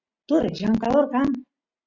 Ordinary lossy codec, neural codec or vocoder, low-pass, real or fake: Opus, 64 kbps; none; 7.2 kHz; real